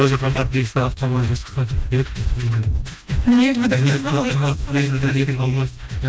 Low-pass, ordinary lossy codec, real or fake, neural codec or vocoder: none; none; fake; codec, 16 kHz, 1 kbps, FreqCodec, smaller model